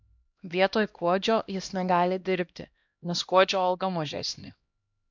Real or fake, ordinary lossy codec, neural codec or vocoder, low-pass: fake; MP3, 64 kbps; codec, 16 kHz, 1 kbps, X-Codec, HuBERT features, trained on LibriSpeech; 7.2 kHz